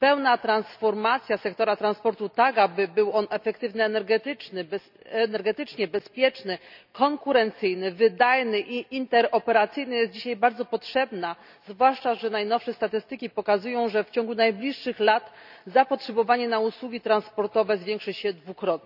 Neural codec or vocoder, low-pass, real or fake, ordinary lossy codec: none; 5.4 kHz; real; none